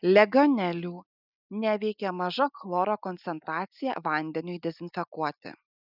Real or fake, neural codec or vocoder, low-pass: real; none; 5.4 kHz